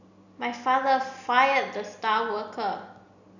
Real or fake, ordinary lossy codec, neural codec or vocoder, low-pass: real; none; none; 7.2 kHz